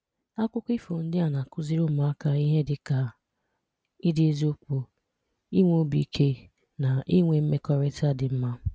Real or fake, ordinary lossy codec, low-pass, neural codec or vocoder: real; none; none; none